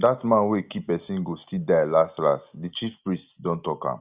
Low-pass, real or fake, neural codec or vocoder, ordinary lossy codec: 3.6 kHz; real; none; Opus, 64 kbps